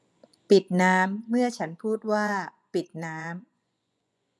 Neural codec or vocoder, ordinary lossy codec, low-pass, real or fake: vocoder, 24 kHz, 100 mel bands, Vocos; none; none; fake